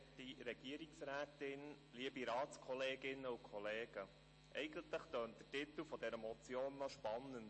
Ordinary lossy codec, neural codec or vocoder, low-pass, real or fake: MP3, 32 kbps; none; 10.8 kHz; real